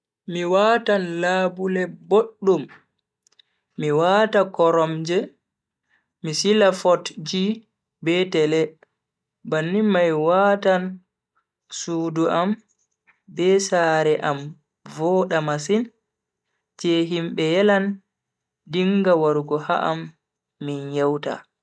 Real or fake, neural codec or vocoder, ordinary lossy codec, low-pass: real; none; none; none